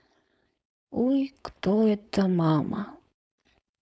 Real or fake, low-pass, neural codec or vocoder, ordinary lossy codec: fake; none; codec, 16 kHz, 4.8 kbps, FACodec; none